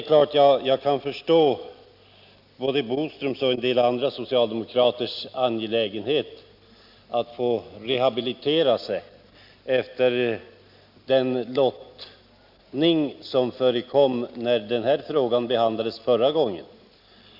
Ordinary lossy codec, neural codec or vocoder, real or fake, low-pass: Opus, 64 kbps; none; real; 5.4 kHz